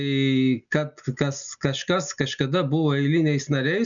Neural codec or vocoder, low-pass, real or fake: none; 7.2 kHz; real